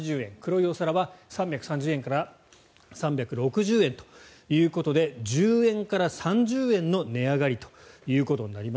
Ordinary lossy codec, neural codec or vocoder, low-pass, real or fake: none; none; none; real